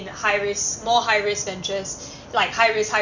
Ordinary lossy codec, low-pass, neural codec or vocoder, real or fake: none; 7.2 kHz; none; real